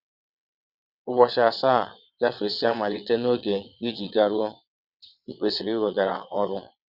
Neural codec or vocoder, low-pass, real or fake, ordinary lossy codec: vocoder, 22.05 kHz, 80 mel bands, WaveNeXt; 5.4 kHz; fake; none